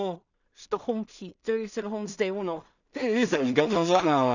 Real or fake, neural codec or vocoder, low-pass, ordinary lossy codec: fake; codec, 16 kHz in and 24 kHz out, 0.4 kbps, LongCat-Audio-Codec, two codebook decoder; 7.2 kHz; none